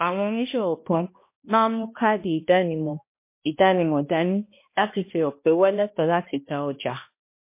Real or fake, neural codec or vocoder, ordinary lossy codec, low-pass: fake; codec, 16 kHz, 1 kbps, X-Codec, HuBERT features, trained on balanced general audio; MP3, 24 kbps; 3.6 kHz